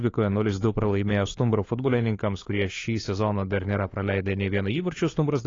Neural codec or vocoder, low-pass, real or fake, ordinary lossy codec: codec, 16 kHz, 4 kbps, FunCodec, trained on LibriTTS, 50 frames a second; 7.2 kHz; fake; AAC, 32 kbps